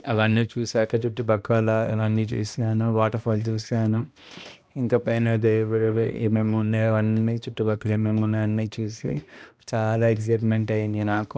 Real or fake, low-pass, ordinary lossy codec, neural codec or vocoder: fake; none; none; codec, 16 kHz, 1 kbps, X-Codec, HuBERT features, trained on balanced general audio